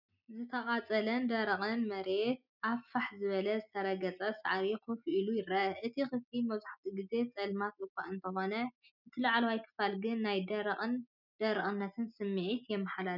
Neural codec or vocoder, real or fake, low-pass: none; real; 5.4 kHz